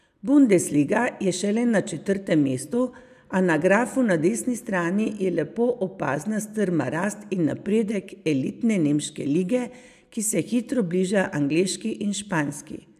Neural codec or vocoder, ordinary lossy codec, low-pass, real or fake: none; none; 14.4 kHz; real